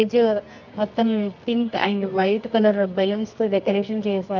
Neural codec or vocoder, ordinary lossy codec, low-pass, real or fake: codec, 24 kHz, 0.9 kbps, WavTokenizer, medium music audio release; none; 7.2 kHz; fake